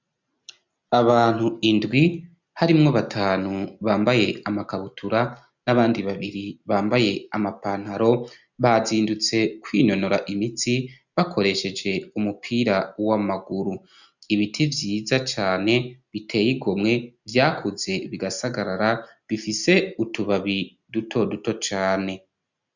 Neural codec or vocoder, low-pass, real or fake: none; 7.2 kHz; real